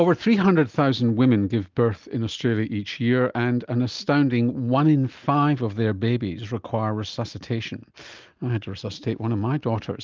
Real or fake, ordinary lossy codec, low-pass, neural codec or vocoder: real; Opus, 24 kbps; 7.2 kHz; none